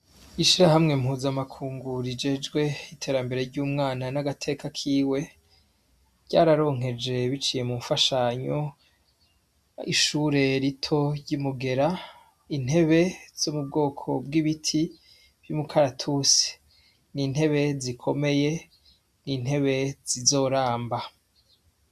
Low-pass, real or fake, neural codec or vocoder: 14.4 kHz; real; none